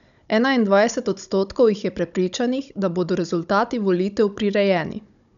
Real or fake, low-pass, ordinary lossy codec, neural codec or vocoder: fake; 7.2 kHz; none; codec, 16 kHz, 16 kbps, FunCodec, trained on Chinese and English, 50 frames a second